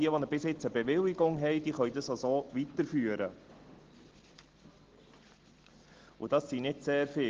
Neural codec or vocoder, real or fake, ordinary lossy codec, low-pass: none; real; Opus, 16 kbps; 7.2 kHz